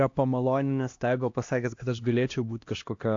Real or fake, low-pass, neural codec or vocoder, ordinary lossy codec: fake; 7.2 kHz; codec, 16 kHz, 1 kbps, X-Codec, HuBERT features, trained on LibriSpeech; AAC, 48 kbps